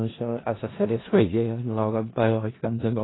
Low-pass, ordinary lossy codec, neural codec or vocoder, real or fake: 7.2 kHz; AAC, 16 kbps; codec, 16 kHz in and 24 kHz out, 0.4 kbps, LongCat-Audio-Codec, four codebook decoder; fake